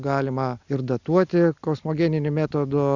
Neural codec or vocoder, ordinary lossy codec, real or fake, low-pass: none; Opus, 64 kbps; real; 7.2 kHz